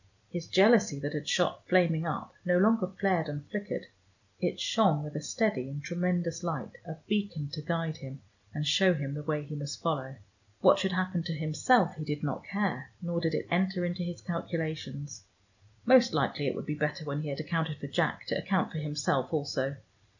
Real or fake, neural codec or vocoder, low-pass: real; none; 7.2 kHz